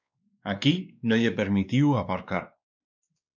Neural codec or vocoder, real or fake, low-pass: codec, 16 kHz, 2 kbps, X-Codec, WavLM features, trained on Multilingual LibriSpeech; fake; 7.2 kHz